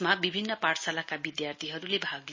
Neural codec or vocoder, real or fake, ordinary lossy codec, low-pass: none; real; MP3, 32 kbps; 7.2 kHz